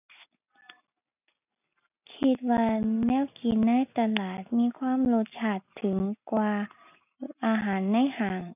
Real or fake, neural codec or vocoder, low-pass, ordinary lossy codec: real; none; 3.6 kHz; none